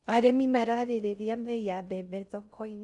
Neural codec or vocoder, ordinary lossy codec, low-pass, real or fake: codec, 16 kHz in and 24 kHz out, 0.6 kbps, FocalCodec, streaming, 4096 codes; none; 10.8 kHz; fake